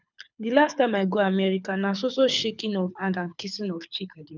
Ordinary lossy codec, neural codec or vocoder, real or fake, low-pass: none; codec, 24 kHz, 6 kbps, HILCodec; fake; 7.2 kHz